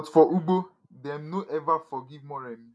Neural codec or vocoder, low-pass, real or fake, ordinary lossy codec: none; none; real; none